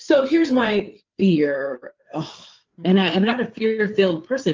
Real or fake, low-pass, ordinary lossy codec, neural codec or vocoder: fake; 7.2 kHz; Opus, 24 kbps; codec, 16 kHz, 4 kbps, FreqCodec, larger model